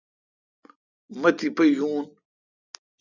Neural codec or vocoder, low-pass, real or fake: none; 7.2 kHz; real